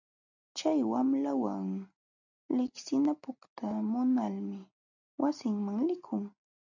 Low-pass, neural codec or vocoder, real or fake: 7.2 kHz; none; real